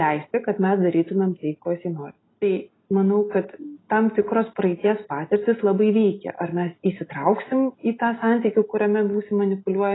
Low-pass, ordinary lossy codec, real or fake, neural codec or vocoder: 7.2 kHz; AAC, 16 kbps; real; none